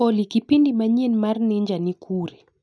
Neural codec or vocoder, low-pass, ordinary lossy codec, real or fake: none; none; none; real